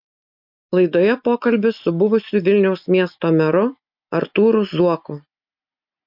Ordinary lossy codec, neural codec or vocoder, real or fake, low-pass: MP3, 48 kbps; none; real; 5.4 kHz